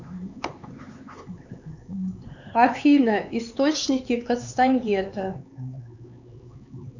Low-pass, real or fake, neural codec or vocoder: 7.2 kHz; fake; codec, 16 kHz, 4 kbps, X-Codec, HuBERT features, trained on LibriSpeech